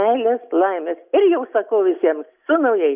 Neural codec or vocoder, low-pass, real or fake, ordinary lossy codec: none; 3.6 kHz; real; Opus, 64 kbps